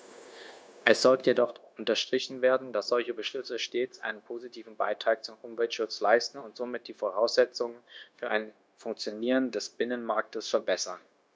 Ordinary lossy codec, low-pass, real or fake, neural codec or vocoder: none; none; fake; codec, 16 kHz, 0.9 kbps, LongCat-Audio-Codec